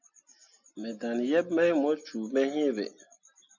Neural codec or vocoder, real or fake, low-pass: none; real; 7.2 kHz